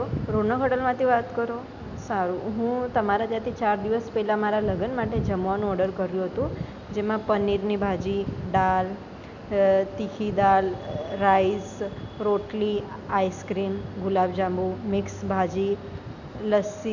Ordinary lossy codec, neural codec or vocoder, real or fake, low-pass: none; none; real; 7.2 kHz